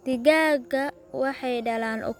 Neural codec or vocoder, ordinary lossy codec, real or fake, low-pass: none; none; real; 19.8 kHz